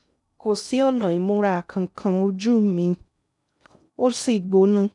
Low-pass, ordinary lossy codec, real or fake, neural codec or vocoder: 10.8 kHz; none; fake; codec, 16 kHz in and 24 kHz out, 0.6 kbps, FocalCodec, streaming, 2048 codes